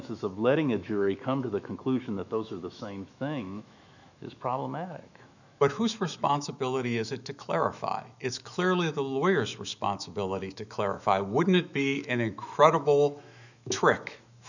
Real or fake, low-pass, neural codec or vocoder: fake; 7.2 kHz; autoencoder, 48 kHz, 128 numbers a frame, DAC-VAE, trained on Japanese speech